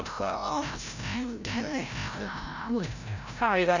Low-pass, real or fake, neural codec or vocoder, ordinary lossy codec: 7.2 kHz; fake; codec, 16 kHz, 0.5 kbps, FreqCodec, larger model; none